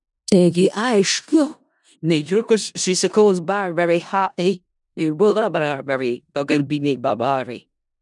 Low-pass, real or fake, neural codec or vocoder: 10.8 kHz; fake; codec, 16 kHz in and 24 kHz out, 0.4 kbps, LongCat-Audio-Codec, four codebook decoder